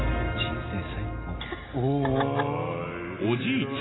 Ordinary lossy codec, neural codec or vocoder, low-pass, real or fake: AAC, 16 kbps; none; 7.2 kHz; real